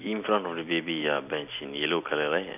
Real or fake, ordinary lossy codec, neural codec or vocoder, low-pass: real; none; none; 3.6 kHz